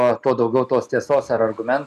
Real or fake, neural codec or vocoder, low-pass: real; none; 14.4 kHz